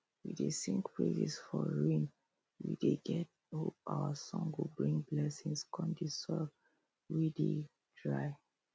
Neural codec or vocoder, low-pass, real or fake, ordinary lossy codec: none; none; real; none